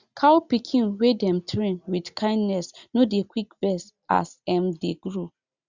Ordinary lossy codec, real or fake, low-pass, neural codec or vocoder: Opus, 64 kbps; real; 7.2 kHz; none